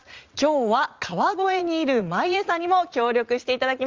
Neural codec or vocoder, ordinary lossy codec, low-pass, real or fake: vocoder, 44.1 kHz, 80 mel bands, Vocos; Opus, 32 kbps; 7.2 kHz; fake